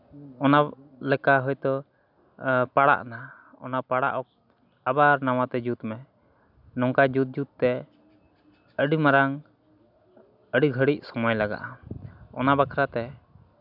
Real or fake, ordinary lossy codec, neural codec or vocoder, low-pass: real; none; none; 5.4 kHz